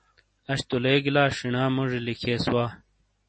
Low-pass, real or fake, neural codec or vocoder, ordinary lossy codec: 9.9 kHz; real; none; MP3, 32 kbps